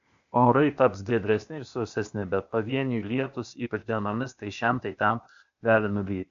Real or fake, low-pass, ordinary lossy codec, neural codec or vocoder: fake; 7.2 kHz; AAC, 96 kbps; codec, 16 kHz, 0.8 kbps, ZipCodec